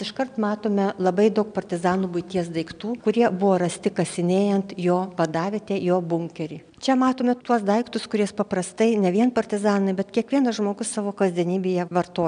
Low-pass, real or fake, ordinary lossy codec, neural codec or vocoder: 9.9 kHz; real; MP3, 96 kbps; none